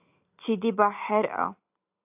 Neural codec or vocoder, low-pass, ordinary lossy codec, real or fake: none; 3.6 kHz; AAC, 32 kbps; real